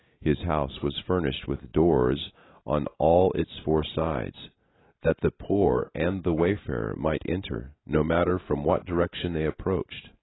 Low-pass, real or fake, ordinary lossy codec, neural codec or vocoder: 7.2 kHz; real; AAC, 16 kbps; none